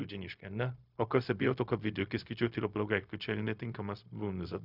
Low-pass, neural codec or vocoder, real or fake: 5.4 kHz; codec, 16 kHz, 0.4 kbps, LongCat-Audio-Codec; fake